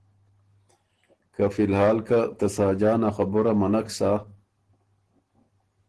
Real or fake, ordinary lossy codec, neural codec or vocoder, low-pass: real; Opus, 16 kbps; none; 10.8 kHz